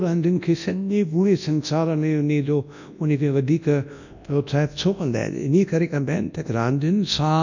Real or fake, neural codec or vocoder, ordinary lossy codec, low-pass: fake; codec, 24 kHz, 0.9 kbps, WavTokenizer, large speech release; none; 7.2 kHz